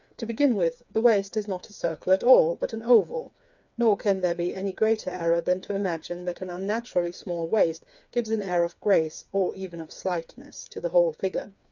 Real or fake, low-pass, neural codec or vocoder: fake; 7.2 kHz; codec, 16 kHz, 4 kbps, FreqCodec, smaller model